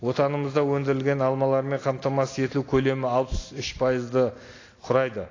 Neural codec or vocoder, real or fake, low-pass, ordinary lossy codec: none; real; 7.2 kHz; AAC, 32 kbps